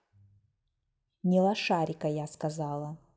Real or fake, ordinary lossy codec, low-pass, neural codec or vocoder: real; none; none; none